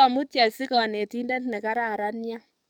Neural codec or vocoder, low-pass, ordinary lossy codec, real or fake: codec, 44.1 kHz, 7.8 kbps, DAC; 19.8 kHz; none; fake